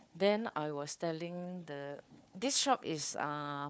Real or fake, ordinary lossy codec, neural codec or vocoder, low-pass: fake; none; codec, 16 kHz, 4 kbps, FunCodec, trained on Chinese and English, 50 frames a second; none